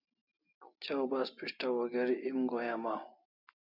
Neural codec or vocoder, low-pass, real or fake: none; 5.4 kHz; real